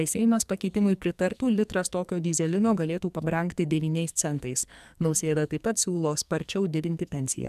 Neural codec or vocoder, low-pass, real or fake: codec, 44.1 kHz, 2.6 kbps, SNAC; 14.4 kHz; fake